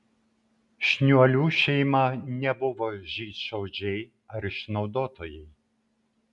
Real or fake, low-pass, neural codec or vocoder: real; 10.8 kHz; none